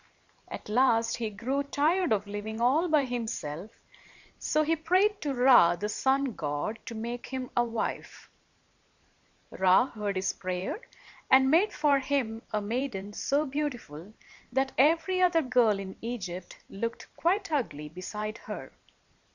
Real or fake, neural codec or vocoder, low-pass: fake; vocoder, 44.1 kHz, 128 mel bands every 512 samples, BigVGAN v2; 7.2 kHz